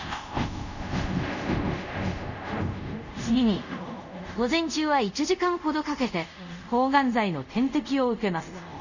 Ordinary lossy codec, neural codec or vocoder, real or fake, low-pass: none; codec, 24 kHz, 0.5 kbps, DualCodec; fake; 7.2 kHz